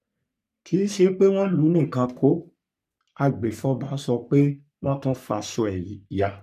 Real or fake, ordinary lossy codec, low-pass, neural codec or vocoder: fake; none; 14.4 kHz; codec, 44.1 kHz, 3.4 kbps, Pupu-Codec